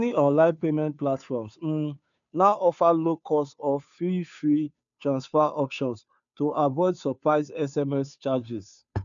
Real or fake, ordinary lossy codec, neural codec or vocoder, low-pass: fake; none; codec, 16 kHz, 2 kbps, FunCodec, trained on Chinese and English, 25 frames a second; 7.2 kHz